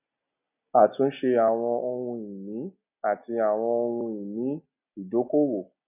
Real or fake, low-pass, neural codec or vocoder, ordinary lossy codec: real; 3.6 kHz; none; none